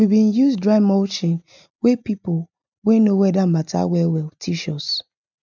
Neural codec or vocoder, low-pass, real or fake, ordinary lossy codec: none; 7.2 kHz; real; none